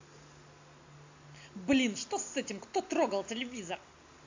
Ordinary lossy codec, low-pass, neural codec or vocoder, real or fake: AAC, 48 kbps; 7.2 kHz; none; real